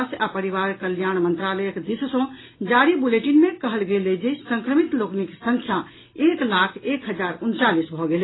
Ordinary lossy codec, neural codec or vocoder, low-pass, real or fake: AAC, 16 kbps; none; 7.2 kHz; real